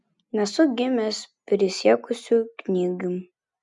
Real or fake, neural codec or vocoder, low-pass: real; none; 10.8 kHz